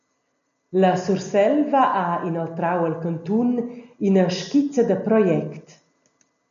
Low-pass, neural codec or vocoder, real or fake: 7.2 kHz; none; real